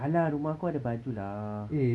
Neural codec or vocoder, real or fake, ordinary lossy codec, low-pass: none; real; none; none